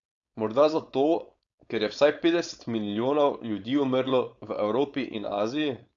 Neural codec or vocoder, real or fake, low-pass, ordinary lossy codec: codec, 16 kHz, 4.8 kbps, FACodec; fake; 7.2 kHz; Opus, 64 kbps